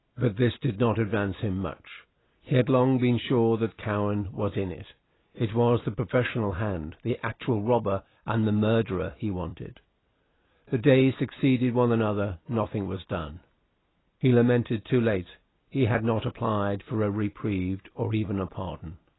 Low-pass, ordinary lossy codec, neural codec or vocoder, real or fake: 7.2 kHz; AAC, 16 kbps; none; real